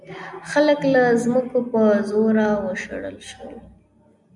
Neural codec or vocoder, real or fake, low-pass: none; real; 10.8 kHz